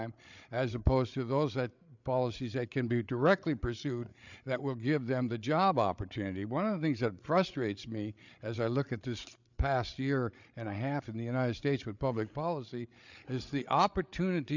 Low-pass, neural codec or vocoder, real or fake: 7.2 kHz; codec, 16 kHz, 8 kbps, FreqCodec, larger model; fake